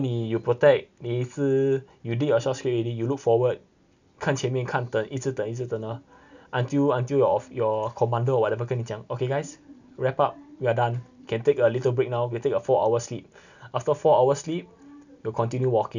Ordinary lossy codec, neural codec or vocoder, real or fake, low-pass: none; none; real; 7.2 kHz